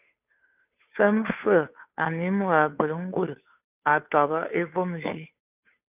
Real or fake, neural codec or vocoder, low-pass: fake; codec, 16 kHz, 2 kbps, FunCodec, trained on Chinese and English, 25 frames a second; 3.6 kHz